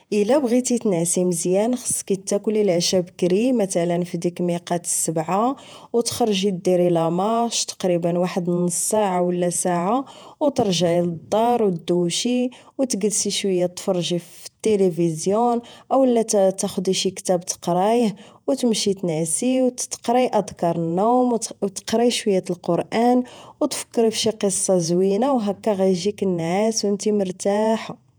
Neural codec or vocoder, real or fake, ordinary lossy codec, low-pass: vocoder, 48 kHz, 128 mel bands, Vocos; fake; none; none